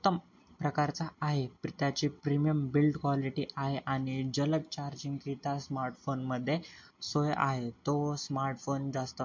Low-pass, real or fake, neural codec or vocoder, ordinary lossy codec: 7.2 kHz; real; none; MP3, 48 kbps